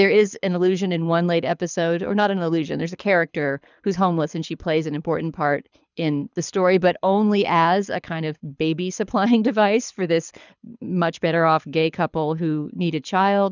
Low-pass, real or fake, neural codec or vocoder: 7.2 kHz; fake; codec, 24 kHz, 6 kbps, HILCodec